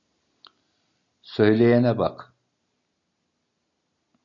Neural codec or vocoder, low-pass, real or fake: none; 7.2 kHz; real